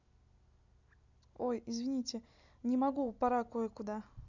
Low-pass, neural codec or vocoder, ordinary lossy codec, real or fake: 7.2 kHz; none; none; real